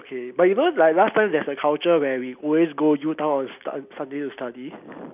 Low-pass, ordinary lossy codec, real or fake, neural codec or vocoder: 3.6 kHz; none; real; none